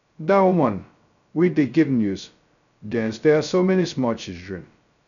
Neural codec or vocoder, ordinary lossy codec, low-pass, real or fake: codec, 16 kHz, 0.2 kbps, FocalCodec; none; 7.2 kHz; fake